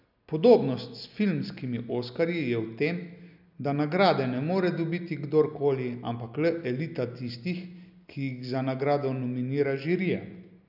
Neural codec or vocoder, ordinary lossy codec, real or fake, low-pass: none; none; real; 5.4 kHz